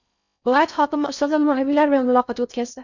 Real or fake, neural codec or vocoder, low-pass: fake; codec, 16 kHz in and 24 kHz out, 0.6 kbps, FocalCodec, streaming, 4096 codes; 7.2 kHz